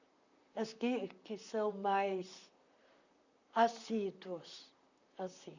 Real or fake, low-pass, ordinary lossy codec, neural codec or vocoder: fake; 7.2 kHz; none; vocoder, 44.1 kHz, 128 mel bands, Pupu-Vocoder